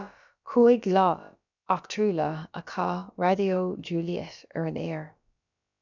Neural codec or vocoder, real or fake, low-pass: codec, 16 kHz, about 1 kbps, DyCAST, with the encoder's durations; fake; 7.2 kHz